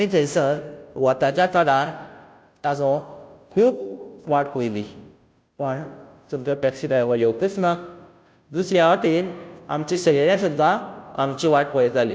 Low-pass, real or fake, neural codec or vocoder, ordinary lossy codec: none; fake; codec, 16 kHz, 0.5 kbps, FunCodec, trained on Chinese and English, 25 frames a second; none